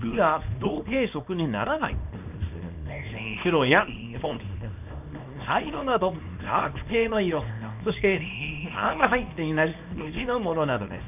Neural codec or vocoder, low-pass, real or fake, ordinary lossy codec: codec, 24 kHz, 0.9 kbps, WavTokenizer, small release; 3.6 kHz; fake; none